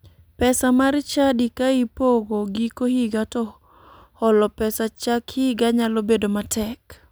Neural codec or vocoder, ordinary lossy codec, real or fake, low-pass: none; none; real; none